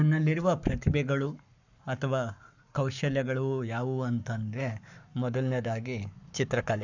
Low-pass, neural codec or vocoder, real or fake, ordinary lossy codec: 7.2 kHz; codec, 44.1 kHz, 7.8 kbps, Pupu-Codec; fake; none